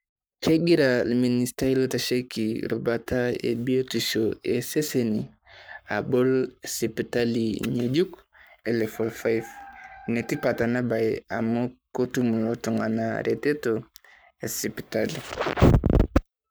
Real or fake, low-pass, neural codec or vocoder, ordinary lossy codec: fake; none; codec, 44.1 kHz, 7.8 kbps, Pupu-Codec; none